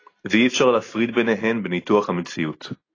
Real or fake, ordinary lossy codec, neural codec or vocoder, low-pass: real; AAC, 32 kbps; none; 7.2 kHz